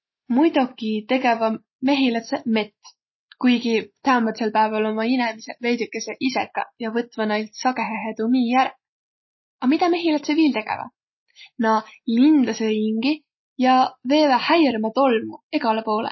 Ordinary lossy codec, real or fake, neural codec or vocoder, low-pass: MP3, 24 kbps; real; none; 7.2 kHz